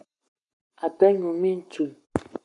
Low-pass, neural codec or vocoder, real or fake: 10.8 kHz; codec, 44.1 kHz, 7.8 kbps, Pupu-Codec; fake